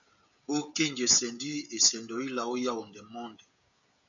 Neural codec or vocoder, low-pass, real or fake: codec, 16 kHz, 16 kbps, FreqCodec, smaller model; 7.2 kHz; fake